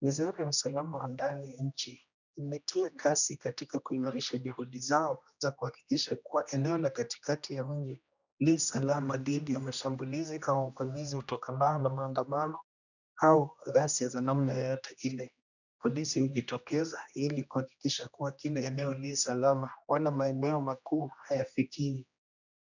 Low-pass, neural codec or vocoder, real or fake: 7.2 kHz; codec, 16 kHz, 1 kbps, X-Codec, HuBERT features, trained on general audio; fake